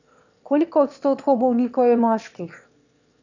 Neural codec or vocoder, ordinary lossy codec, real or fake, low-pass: autoencoder, 22.05 kHz, a latent of 192 numbers a frame, VITS, trained on one speaker; none; fake; 7.2 kHz